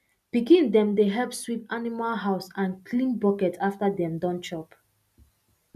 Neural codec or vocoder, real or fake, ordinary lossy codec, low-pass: none; real; none; 14.4 kHz